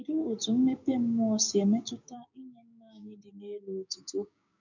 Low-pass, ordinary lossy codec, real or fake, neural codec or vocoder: 7.2 kHz; none; fake; codec, 16 kHz, 6 kbps, DAC